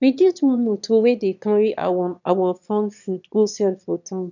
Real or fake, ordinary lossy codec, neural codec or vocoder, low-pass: fake; none; autoencoder, 22.05 kHz, a latent of 192 numbers a frame, VITS, trained on one speaker; 7.2 kHz